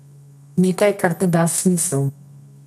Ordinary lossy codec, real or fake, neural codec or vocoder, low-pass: none; fake; codec, 24 kHz, 0.9 kbps, WavTokenizer, medium music audio release; none